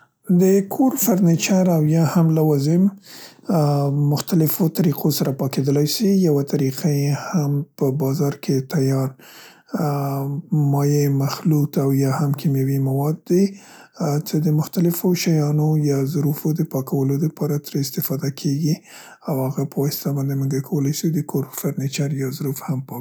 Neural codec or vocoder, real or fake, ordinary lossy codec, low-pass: none; real; none; none